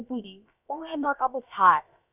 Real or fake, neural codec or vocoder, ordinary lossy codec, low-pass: fake; codec, 16 kHz, about 1 kbps, DyCAST, with the encoder's durations; none; 3.6 kHz